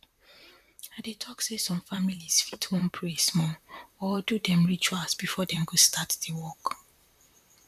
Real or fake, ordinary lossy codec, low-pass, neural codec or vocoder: fake; none; 14.4 kHz; vocoder, 44.1 kHz, 128 mel bands, Pupu-Vocoder